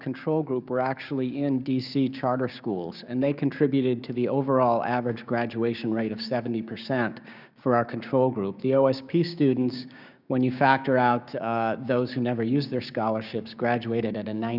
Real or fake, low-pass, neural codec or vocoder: fake; 5.4 kHz; codec, 16 kHz, 6 kbps, DAC